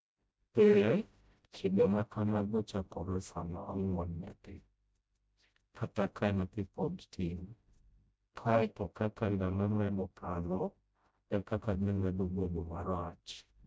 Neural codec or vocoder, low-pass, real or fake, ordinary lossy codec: codec, 16 kHz, 0.5 kbps, FreqCodec, smaller model; none; fake; none